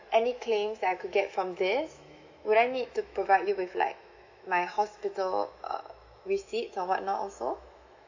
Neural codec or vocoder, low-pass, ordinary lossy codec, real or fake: autoencoder, 48 kHz, 128 numbers a frame, DAC-VAE, trained on Japanese speech; 7.2 kHz; AAC, 48 kbps; fake